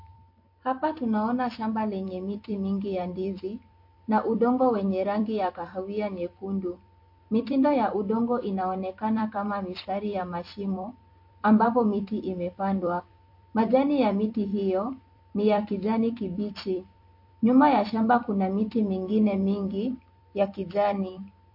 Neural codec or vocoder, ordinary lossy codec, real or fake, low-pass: vocoder, 44.1 kHz, 128 mel bands every 256 samples, BigVGAN v2; MP3, 32 kbps; fake; 5.4 kHz